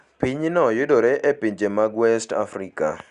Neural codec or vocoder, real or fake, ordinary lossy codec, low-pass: none; real; Opus, 64 kbps; 10.8 kHz